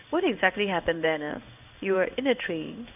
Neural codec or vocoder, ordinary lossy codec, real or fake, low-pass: codec, 16 kHz in and 24 kHz out, 1 kbps, XY-Tokenizer; none; fake; 3.6 kHz